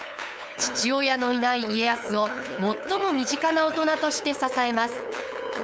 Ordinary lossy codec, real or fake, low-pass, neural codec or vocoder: none; fake; none; codec, 16 kHz, 8 kbps, FunCodec, trained on LibriTTS, 25 frames a second